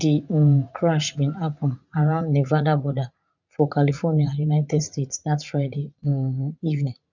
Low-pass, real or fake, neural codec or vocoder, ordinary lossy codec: 7.2 kHz; fake; vocoder, 44.1 kHz, 80 mel bands, Vocos; none